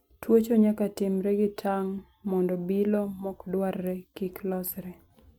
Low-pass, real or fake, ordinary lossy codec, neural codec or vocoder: 19.8 kHz; real; MP3, 96 kbps; none